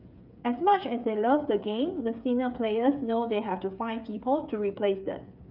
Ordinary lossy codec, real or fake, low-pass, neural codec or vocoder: none; fake; 5.4 kHz; codec, 16 kHz, 8 kbps, FreqCodec, smaller model